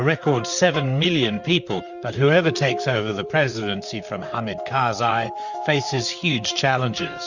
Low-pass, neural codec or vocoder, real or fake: 7.2 kHz; vocoder, 44.1 kHz, 128 mel bands, Pupu-Vocoder; fake